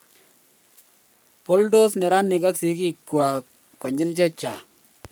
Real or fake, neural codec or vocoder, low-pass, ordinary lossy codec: fake; codec, 44.1 kHz, 3.4 kbps, Pupu-Codec; none; none